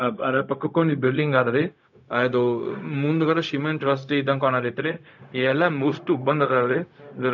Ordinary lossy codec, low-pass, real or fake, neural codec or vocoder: none; 7.2 kHz; fake; codec, 16 kHz, 0.4 kbps, LongCat-Audio-Codec